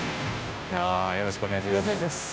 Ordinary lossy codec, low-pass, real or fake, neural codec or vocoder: none; none; fake; codec, 16 kHz, 0.5 kbps, FunCodec, trained on Chinese and English, 25 frames a second